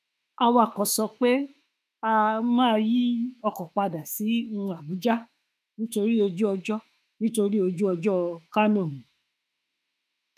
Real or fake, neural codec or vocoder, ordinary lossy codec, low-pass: fake; autoencoder, 48 kHz, 32 numbers a frame, DAC-VAE, trained on Japanese speech; none; 14.4 kHz